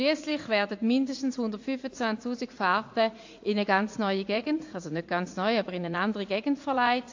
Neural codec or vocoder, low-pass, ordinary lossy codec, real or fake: vocoder, 44.1 kHz, 80 mel bands, Vocos; 7.2 kHz; AAC, 48 kbps; fake